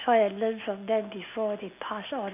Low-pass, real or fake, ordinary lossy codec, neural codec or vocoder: 3.6 kHz; real; none; none